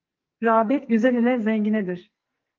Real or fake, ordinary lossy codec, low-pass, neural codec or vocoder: fake; Opus, 32 kbps; 7.2 kHz; codec, 44.1 kHz, 2.6 kbps, SNAC